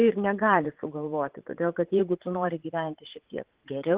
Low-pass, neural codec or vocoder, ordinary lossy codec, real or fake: 3.6 kHz; vocoder, 44.1 kHz, 80 mel bands, Vocos; Opus, 16 kbps; fake